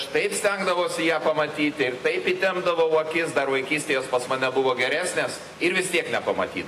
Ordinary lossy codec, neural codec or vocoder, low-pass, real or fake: AAC, 48 kbps; none; 14.4 kHz; real